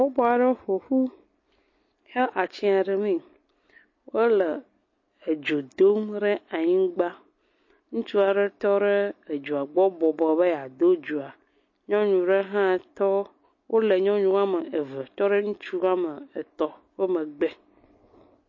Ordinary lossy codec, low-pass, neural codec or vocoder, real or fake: MP3, 32 kbps; 7.2 kHz; none; real